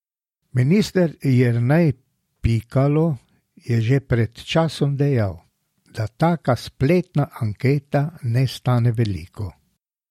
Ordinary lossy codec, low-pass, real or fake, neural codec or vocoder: MP3, 64 kbps; 19.8 kHz; real; none